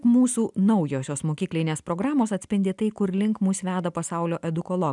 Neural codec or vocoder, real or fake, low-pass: none; real; 10.8 kHz